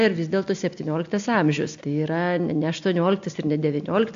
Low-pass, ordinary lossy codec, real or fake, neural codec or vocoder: 7.2 kHz; AAC, 64 kbps; real; none